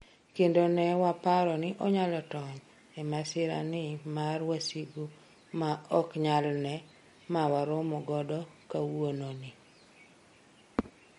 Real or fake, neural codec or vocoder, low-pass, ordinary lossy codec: real; none; 14.4 kHz; MP3, 48 kbps